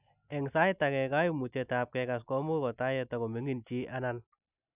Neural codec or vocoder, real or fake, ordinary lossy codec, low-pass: none; real; none; 3.6 kHz